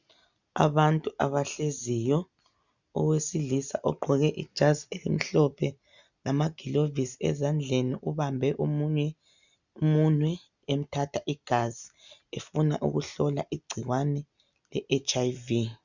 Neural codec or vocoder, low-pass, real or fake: none; 7.2 kHz; real